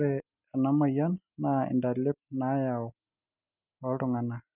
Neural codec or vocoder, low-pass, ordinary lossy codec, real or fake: none; 3.6 kHz; none; real